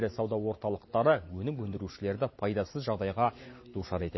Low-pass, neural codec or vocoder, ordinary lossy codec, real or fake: 7.2 kHz; none; MP3, 24 kbps; real